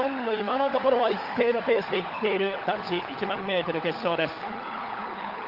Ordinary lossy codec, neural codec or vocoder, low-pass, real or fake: Opus, 32 kbps; codec, 16 kHz, 16 kbps, FunCodec, trained on LibriTTS, 50 frames a second; 5.4 kHz; fake